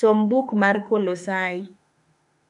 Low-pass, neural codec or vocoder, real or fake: 10.8 kHz; autoencoder, 48 kHz, 32 numbers a frame, DAC-VAE, trained on Japanese speech; fake